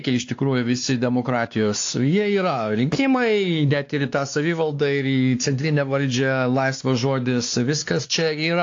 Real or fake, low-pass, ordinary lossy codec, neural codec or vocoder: fake; 7.2 kHz; AAC, 48 kbps; codec, 16 kHz, 2 kbps, X-Codec, WavLM features, trained on Multilingual LibriSpeech